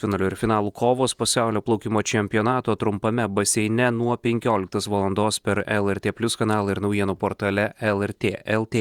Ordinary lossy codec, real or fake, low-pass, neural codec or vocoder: Opus, 64 kbps; fake; 19.8 kHz; vocoder, 48 kHz, 128 mel bands, Vocos